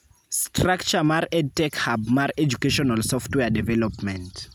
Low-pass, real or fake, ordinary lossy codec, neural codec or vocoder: none; real; none; none